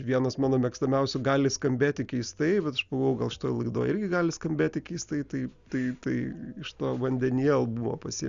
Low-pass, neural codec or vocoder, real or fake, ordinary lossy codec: 7.2 kHz; none; real; Opus, 64 kbps